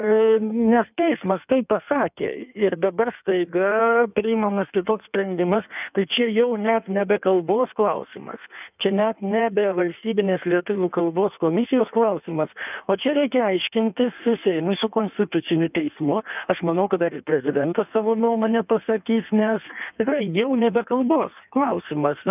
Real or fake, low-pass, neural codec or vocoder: fake; 3.6 kHz; codec, 16 kHz in and 24 kHz out, 1.1 kbps, FireRedTTS-2 codec